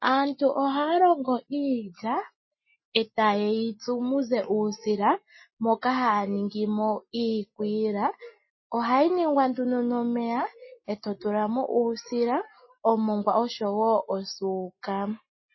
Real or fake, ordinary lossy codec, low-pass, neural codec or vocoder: real; MP3, 24 kbps; 7.2 kHz; none